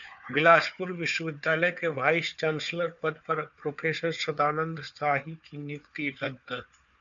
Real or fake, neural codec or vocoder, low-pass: fake; codec, 16 kHz, 4 kbps, FunCodec, trained on Chinese and English, 50 frames a second; 7.2 kHz